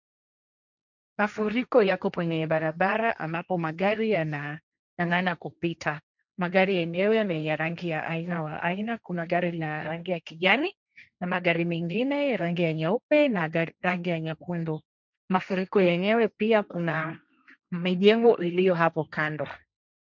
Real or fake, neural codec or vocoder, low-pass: fake; codec, 16 kHz, 1.1 kbps, Voila-Tokenizer; 7.2 kHz